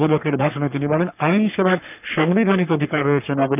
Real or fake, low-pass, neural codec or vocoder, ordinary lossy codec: fake; 3.6 kHz; codec, 44.1 kHz, 3.4 kbps, Pupu-Codec; none